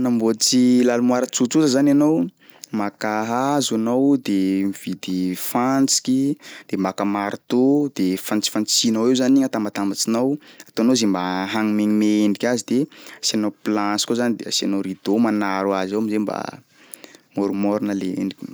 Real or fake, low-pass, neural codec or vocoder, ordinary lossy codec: real; none; none; none